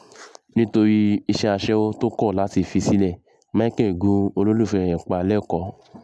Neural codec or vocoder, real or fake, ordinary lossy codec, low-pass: none; real; none; none